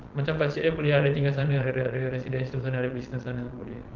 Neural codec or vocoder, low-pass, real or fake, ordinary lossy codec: vocoder, 22.05 kHz, 80 mel bands, Vocos; 7.2 kHz; fake; Opus, 32 kbps